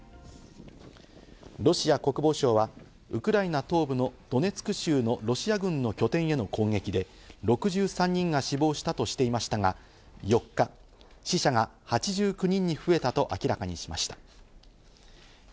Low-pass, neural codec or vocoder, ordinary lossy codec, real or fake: none; none; none; real